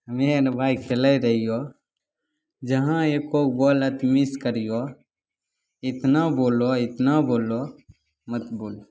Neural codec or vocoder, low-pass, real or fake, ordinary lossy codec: none; none; real; none